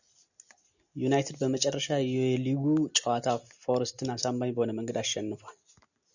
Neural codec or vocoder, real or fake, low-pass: none; real; 7.2 kHz